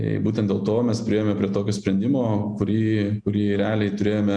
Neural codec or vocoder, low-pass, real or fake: none; 9.9 kHz; real